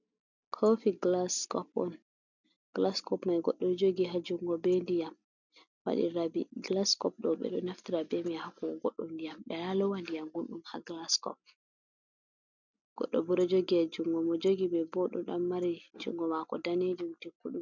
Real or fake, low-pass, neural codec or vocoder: real; 7.2 kHz; none